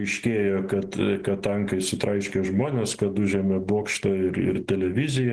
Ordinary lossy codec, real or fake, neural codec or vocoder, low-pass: Opus, 16 kbps; real; none; 10.8 kHz